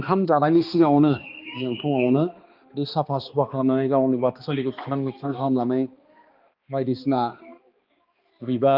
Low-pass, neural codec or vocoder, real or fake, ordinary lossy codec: 5.4 kHz; codec, 16 kHz, 2 kbps, X-Codec, HuBERT features, trained on balanced general audio; fake; Opus, 32 kbps